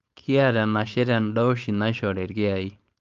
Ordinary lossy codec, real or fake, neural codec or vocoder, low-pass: Opus, 32 kbps; fake; codec, 16 kHz, 4.8 kbps, FACodec; 7.2 kHz